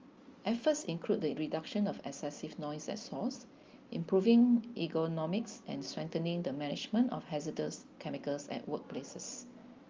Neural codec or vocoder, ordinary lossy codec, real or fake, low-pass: none; Opus, 32 kbps; real; 7.2 kHz